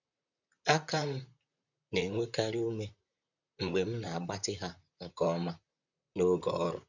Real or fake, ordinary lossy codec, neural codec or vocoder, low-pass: fake; none; vocoder, 44.1 kHz, 128 mel bands, Pupu-Vocoder; 7.2 kHz